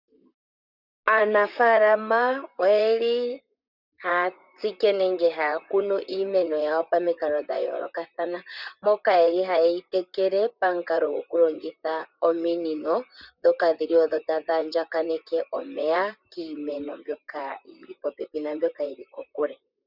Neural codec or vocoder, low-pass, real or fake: vocoder, 44.1 kHz, 128 mel bands, Pupu-Vocoder; 5.4 kHz; fake